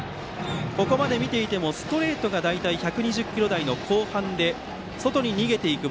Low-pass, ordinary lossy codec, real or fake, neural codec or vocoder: none; none; real; none